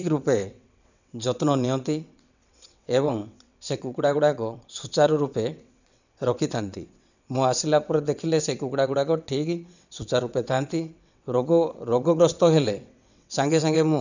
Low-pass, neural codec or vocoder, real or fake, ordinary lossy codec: 7.2 kHz; vocoder, 22.05 kHz, 80 mel bands, WaveNeXt; fake; none